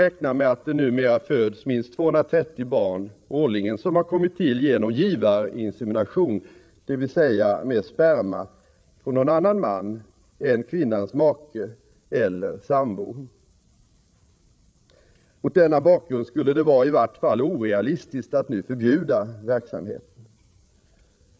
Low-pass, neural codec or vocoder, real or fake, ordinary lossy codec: none; codec, 16 kHz, 8 kbps, FreqCodec, larger model; fake; none